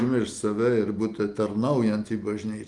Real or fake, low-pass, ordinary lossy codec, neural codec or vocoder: real; 10.8 kHz; Opus, 32 kbps; none